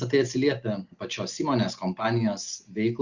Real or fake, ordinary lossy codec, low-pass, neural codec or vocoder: real; Opus, 64 kbps; 7.2 kHz; none